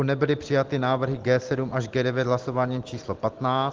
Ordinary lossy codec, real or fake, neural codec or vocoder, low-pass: Opus, 16 kbps; real; none; 7.2 kHz